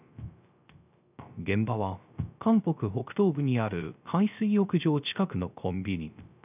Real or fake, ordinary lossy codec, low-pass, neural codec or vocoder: fake; none; 3.6 kHz; codec, 16 kHz, 0.3 kbps, FocalCodec